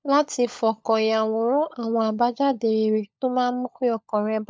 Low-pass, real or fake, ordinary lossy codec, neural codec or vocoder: none; fake; none; codec, 16 kHz, 16 kbps, FunCodec, trained on LibriTTS, 50 frames a second